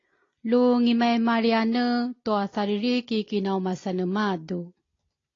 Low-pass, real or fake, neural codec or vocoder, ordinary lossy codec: 7.2 kHz; real; none; AAC, 32 kbps